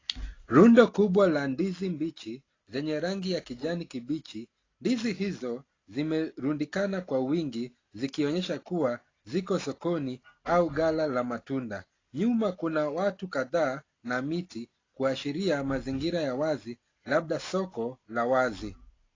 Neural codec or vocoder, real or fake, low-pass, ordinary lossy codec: none; real; 7.2 kHz; AAC, 32 kbps